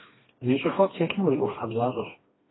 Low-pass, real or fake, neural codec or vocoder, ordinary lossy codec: 7.2 kHz; fake; codec, 16 kHz, 2 kbps, FreqCodec, smaller model; AAC, 16 kbps